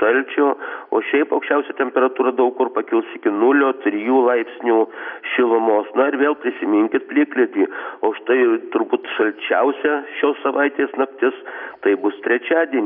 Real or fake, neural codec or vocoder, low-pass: real; none; 5.4 kHz